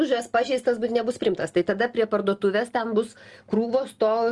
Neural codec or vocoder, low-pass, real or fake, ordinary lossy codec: none; 10.8 kHz; real; Opus, 24 kbps